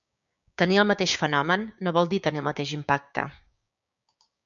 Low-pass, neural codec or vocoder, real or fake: 7.2 kHz; codec, 16 kHz, 6 kbps, DAC; fake